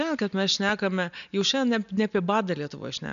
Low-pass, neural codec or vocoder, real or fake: 7.2 kHz; none; real